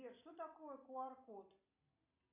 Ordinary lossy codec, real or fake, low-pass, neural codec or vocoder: AAC, 32 kbps; real; 3.6 kHz; none